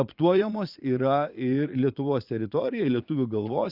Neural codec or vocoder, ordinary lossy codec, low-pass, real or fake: vocoder, 22.05 kHz, 80 mel bands, Vocos; Opus, 64 kbps; 5.4 kHz; fake